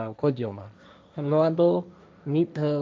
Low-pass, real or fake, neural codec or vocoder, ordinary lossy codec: none; fake; codec, 16 kHz, 1.1 kbps, Voila-Tokenizer; none